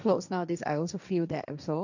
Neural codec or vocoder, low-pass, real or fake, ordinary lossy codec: codec, 16 kHz, 1.1 kbps, Voila-Tokenizer; 7.2 kHz; fake; none